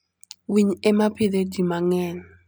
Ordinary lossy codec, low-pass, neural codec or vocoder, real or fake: none; none; none; real